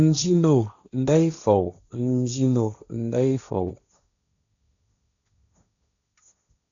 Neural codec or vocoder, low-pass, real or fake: codec, 16 kHz, 1.1 kbps, Voila-Tokenizer; 7.2 kHz; fake